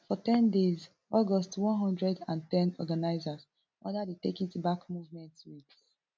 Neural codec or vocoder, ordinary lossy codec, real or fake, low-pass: none; none; real; none